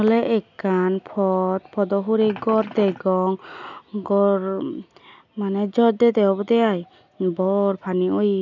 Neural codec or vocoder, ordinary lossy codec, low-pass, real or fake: none; none; 7.2 kHz; real